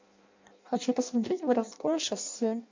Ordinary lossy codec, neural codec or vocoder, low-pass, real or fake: MP3, 64 kbps; codec, 16 kHz in and 24 kHz out, 0.6 kbps, FireRedTTS-2 codec; 7.2 kHz; fake